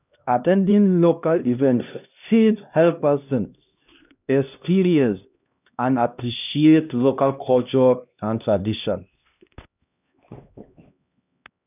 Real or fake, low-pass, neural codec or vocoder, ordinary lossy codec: fake; 3.6 kHz; codec, 16 kHz, 1 kbps, X-Codec, HuBERT features, trained on LibriSpeech; none